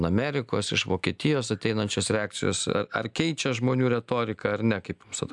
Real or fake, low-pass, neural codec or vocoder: real; 10.8 kHz; none